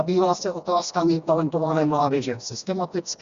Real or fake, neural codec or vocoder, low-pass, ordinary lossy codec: fake; codec, 16 kHz, 1 kbps, FreqCodec, smaller model; 7.2 kHz; Opus, 64 kbps